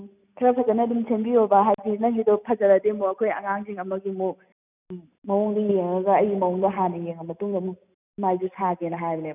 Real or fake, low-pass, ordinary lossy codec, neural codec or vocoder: real; 3.6 kHz; none; none